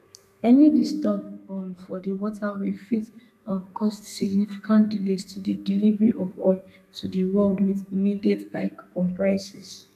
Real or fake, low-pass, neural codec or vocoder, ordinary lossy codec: fake; 14.4 kHz; codec, 32 kHz, 1.9 kbps, SNAC; none